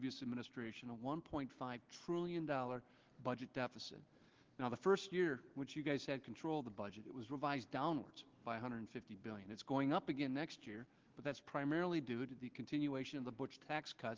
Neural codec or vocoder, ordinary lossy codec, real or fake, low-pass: none; Opus, 16 kbps; real; 7.2 kHz